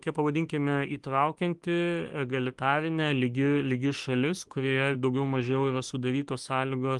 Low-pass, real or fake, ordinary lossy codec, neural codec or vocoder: 10.8 kHz; fake; Opus, 24 kbps; autoencoder, 48 kHz, 32 numbers a frame, DAC-VAE, trained on Japanese speech